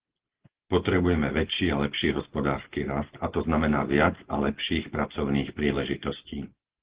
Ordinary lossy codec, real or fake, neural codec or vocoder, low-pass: Opus, 16 kbps; real; none; 3.6 kHz